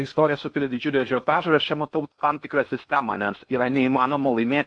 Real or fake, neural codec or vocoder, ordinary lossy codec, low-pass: fake; codec, 16 kHz in and 24 kHz out, 0.8 kbps, FocalCodec, streaming, 65536 codes; AAC, 64 kbps; 9.9 kHz